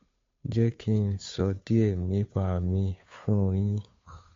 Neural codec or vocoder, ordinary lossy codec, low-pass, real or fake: codec, 16 kHz, 2 kbps, FunCodec, trained on Chinese and English, 25 frames a second; MP3, 48 kbps; 7.2 kHz; fake